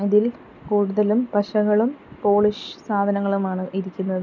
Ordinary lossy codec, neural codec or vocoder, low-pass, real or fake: none; none; 7.2 kHz; real